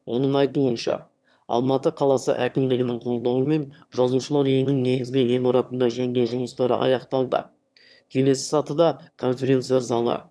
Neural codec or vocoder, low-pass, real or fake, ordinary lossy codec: autoencoder, 22.05 kHz, a latent of 192 numbers a frame, VITS, trained on one speaker; none; fake; none